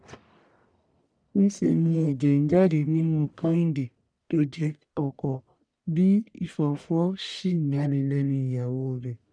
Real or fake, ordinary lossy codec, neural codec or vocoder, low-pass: fake; none; codec, 44.1 kHz, 1.7 kbps, Pupu-Codec; 9.9 kHz